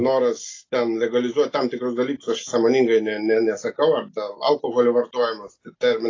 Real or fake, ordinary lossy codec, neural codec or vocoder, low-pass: real; AAC, 32 kbps; none; 7.2 kHz